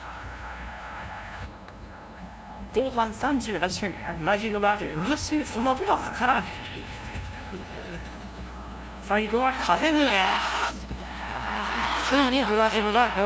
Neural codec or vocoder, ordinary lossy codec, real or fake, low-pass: codec, 16 kHz, 0.5 kbps, FunCodec, trained on LibriTTS, 25 frames a second; none; fake; none